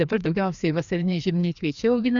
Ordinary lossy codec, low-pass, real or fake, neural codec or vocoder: Opus, 64 kbps; 7.2 kHz; fake; codec, 16 kHz, 2 kbps, FreqCodec, larger model